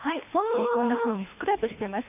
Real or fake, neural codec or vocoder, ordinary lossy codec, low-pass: fake; codec, 24 kHz, 3 kbps, HILCodec; none; 3.6 kHz